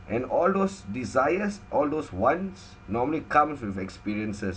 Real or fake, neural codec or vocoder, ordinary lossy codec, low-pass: real; none; none; none